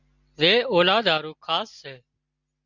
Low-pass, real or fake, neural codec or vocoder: 7.2 kHz; real; none